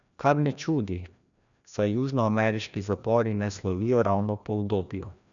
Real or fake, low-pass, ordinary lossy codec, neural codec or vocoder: fake; 7.2 kHz; none; codec, 16 kHz, 1 kbps, FreqCodec, larger model